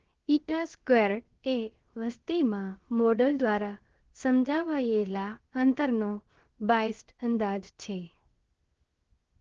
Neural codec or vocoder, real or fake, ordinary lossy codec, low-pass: codec, 16 kHz, 0.7 kbps, FocalCodec; fake; Opus, 16 kbps; 7.2 kHz